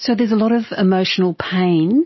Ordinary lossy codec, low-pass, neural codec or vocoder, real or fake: MP3, 24 kbps; 7.2 kHz; none; real